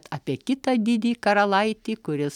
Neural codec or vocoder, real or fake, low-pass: none; real; 19.8 kHz